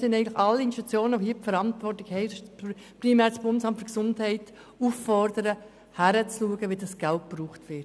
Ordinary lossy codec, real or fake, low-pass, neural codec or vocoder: none; real; none; none